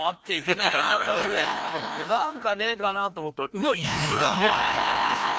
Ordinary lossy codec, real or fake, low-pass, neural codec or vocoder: none; fake; none; codec, 16 kHz, 1 kbps, FreqCodec, larger model